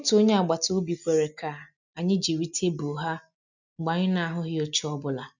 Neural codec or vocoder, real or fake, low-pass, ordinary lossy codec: none; real; 7.2 kHz; none